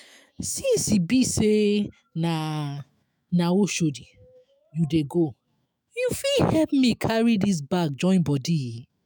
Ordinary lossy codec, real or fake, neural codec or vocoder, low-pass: none; fake; autoencoder, 48 kHz, 128 numbers a frame, DAC-VAE, trained on Japanese speech; none